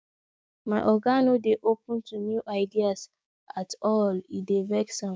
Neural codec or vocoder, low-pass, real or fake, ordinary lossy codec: codec, 16 kHz, 6 kbps, DAC; none; fake; none